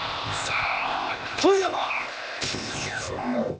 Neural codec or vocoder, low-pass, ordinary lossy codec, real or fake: codec, 16 kHz, 0.8 kbps, ZipCodec; none; none; fake